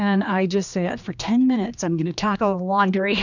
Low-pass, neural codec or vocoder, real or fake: 7.2 kHz; codec, 16 kHz, 2 kbps, X-Codec, HuBERT features, trained on general audio; fake